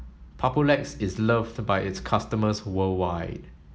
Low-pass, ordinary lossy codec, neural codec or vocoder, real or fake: none; none; none; real